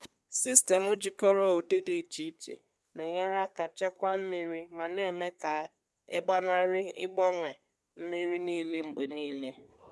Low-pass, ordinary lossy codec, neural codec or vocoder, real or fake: none; none; codec, 24 kHz, 1 kbps, SNAC; fake